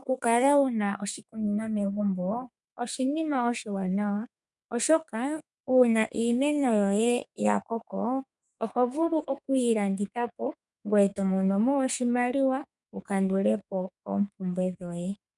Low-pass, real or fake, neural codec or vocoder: 10.8 kHz; fake; codec, 32 kHz, 1.9 kbps, SNAC